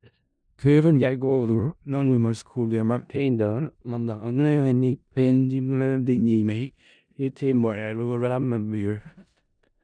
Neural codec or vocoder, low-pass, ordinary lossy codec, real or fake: codec, 16 kHz in and 24 kHz out, 0.4 kbps, LongCat-Audio-Codec, four codebook decoder; 9.9 kHz; none; fake